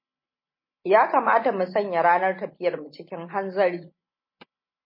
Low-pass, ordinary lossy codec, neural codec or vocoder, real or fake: 5.4 kHz; MP3, 24 kbps; none; real